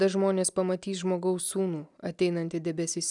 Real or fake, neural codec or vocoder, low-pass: real; none; 10.8 kHz